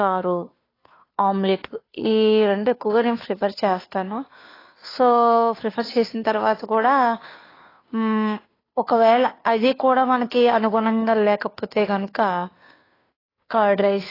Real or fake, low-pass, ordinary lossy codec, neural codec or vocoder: fake; 5.4 kHz; AAC, 24 kbps; codec, 16 kHz, 2 kbps, FunCodec, trained on Chinese and English, 25 frames a second